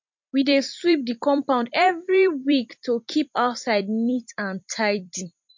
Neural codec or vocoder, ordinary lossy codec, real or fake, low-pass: vocoder, 44.1 kHz, 128 mel bands every 256 samples, BigVGAN v2; MP3, 48 kbps; fake; 7.2 kHz